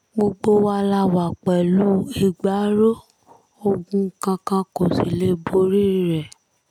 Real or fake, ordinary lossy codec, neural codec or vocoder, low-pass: real; none; none; 19.8 kHz